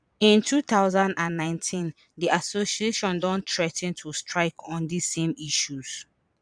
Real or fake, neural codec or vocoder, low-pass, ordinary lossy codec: fake; vocoder, 22.05 kHz, 80 mel bands, Vocos; 9.9 kHz; none